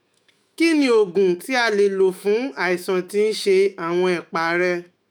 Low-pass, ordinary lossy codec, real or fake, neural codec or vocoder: none; none; fake; autoencoder, 48 kHz, 128 numbers a frame, DAC-VAE, trained on Japanese speech